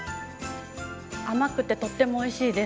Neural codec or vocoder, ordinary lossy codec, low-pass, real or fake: none; none; none; real